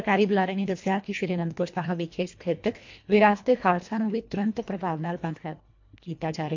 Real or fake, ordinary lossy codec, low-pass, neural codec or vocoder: fake; MP3, 48 kbps; 7.2 kHz; codec, 24 kHz, 1.5 kbps, HILCodec